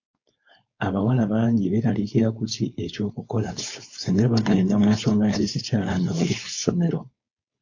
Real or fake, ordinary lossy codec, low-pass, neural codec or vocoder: fake; AAC, 48 kbps; 7.2 kHz; codec, 16 kHz, 4.8 kbps, FACodec